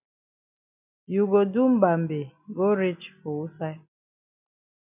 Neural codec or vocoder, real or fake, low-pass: none; real; 3.6 kHz